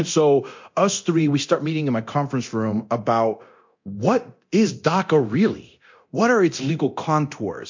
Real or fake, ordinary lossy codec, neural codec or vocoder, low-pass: fake; MP3, 48 kbps; codec, 24 kHz, 0.9 kbps, DualCodec; 7.2 kHz